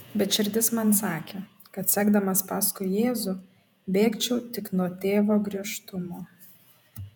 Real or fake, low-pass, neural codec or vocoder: fake; 19.8 kHz; vocoder, 48 kHz, 128 mel bands, Vocos